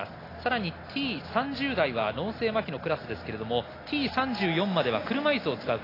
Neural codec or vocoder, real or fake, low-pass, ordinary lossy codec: none; real; 5.4 kHz; AAC, 24 kbps